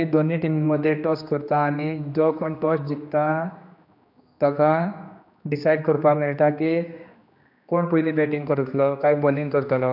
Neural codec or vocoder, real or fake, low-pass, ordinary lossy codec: codec, 16 kHz, 2 kbps, X-Codec, HuBERT features, trained on general audio; fake; 5.4 kHz; none